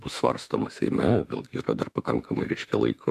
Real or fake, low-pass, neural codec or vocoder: fake; 14.4 kHz; autoencoder, 48 kHz, 32 numbers a frame, DAC-VAE, trained on Japanese speech